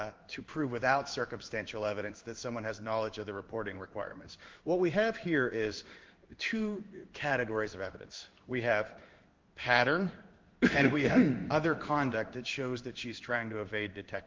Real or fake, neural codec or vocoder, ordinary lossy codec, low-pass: fake; codec, 16 kHz in and 24 kHz out, 1 kbps, XY-Tokenizer; Opus, 32 kbps; 7.2 kHz